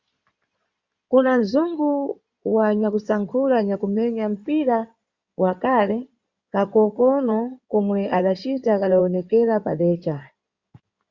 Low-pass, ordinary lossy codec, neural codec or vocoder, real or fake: 7.2 kHz; Opus, 64 kbps; codec, 16 kHz in and 24 kHz out, 2.2 kbps, FireRedTTS-2 codec; fake